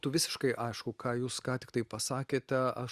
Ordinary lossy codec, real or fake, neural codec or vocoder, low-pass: Opus, 64 kbps; real; none; 14.4 kHz